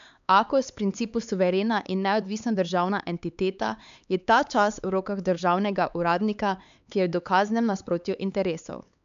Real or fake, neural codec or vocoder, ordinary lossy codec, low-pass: fake; codec, 16 kHz, 4 kbps, X-Codec, HuBERT features, trained on LibriSpeech; AAC, 96 kbps; 7.2 kHz